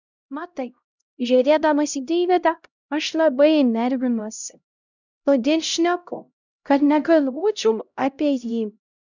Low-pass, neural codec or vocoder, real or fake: 7.2 kHz; codec, 16 kHz, 0.5 kbps, X-Codec, HuBERT features, trained on LibriSpeech; fake